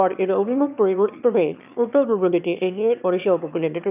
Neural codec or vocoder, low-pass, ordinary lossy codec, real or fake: autoencoder, 22.05 kHz, a latent of 192 numbers a frame, VITS, trained on one speaker; 3.6 kHz; none; fake